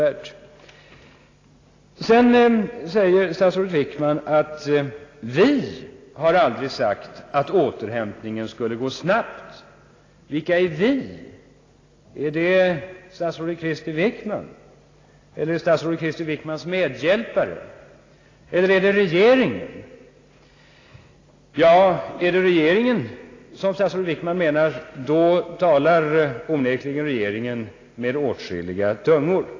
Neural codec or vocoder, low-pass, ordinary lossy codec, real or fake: none; 7.2 kHz; AAC, 32 kbps; real